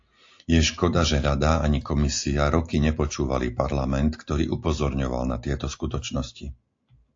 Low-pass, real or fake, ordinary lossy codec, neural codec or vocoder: 7.2 kHz; real; AAC, 48 kbps; none